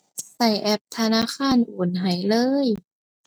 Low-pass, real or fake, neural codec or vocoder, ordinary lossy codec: none; real; none; none